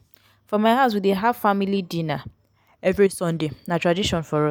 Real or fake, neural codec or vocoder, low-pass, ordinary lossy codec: real; none; none; none